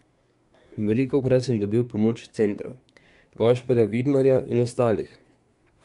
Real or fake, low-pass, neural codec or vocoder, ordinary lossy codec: fake; 10.8 kHz; codec, 24 kHz, 1 kbps, SNAC; none